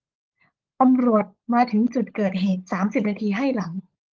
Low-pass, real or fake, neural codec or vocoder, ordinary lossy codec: 7.2 kHz; fake; codec, 16 kHz, 16 kbps, FunCodec, trained on LibriTTS, 50 frames a second; Opus, 16 kbps